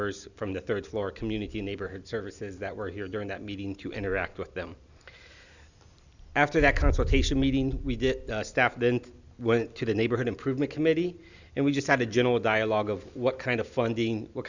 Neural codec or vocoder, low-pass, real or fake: none; 7.2 kHz; real